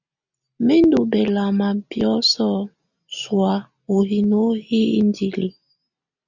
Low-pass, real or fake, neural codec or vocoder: 7.2 kHz; real; none